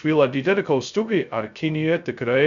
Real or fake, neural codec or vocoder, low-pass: fake; codec, 16 kHz, 0.2 kbps, FocalCodec; 7.2 kHz